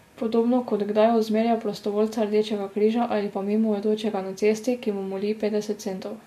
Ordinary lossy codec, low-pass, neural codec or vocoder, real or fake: MP3, 64 kbps; 14.4 kHz; none; real